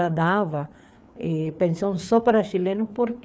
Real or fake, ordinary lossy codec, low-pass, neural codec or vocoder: fake; none; none; codec, 16 kHz, 4 kbps, FreqCodec, larger model